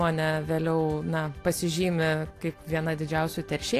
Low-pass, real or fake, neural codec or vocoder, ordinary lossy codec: 14.4 kHz; real; none; AAC, 64 kbps